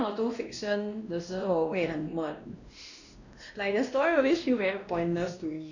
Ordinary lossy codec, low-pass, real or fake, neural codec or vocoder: none; 7.2 kHz; fake; codec, 16 kHz, 1 kbps, X-Codec, WavLM features, trained on Multilingual LibriSpeech